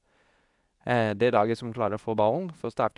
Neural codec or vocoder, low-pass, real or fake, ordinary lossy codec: codec, 24 kHz, 0.9 kbps, WavTokenizer, medium speech release version 2; 10.8 kHz; fake; none